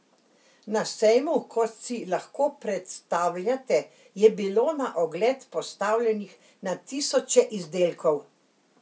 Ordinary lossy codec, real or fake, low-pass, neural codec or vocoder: none; real; none; none